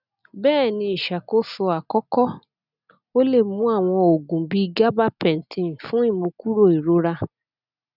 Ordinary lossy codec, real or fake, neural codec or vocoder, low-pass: none; real; none; 5.4 kHz